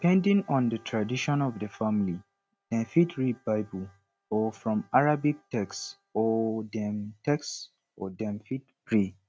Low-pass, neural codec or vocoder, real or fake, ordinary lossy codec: none; none; real; none